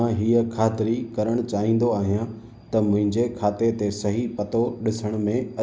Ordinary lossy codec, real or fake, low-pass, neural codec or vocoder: none; real; none; none